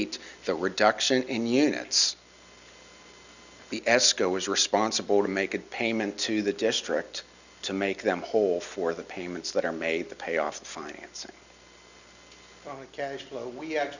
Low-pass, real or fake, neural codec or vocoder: 7.2 kHz; real; none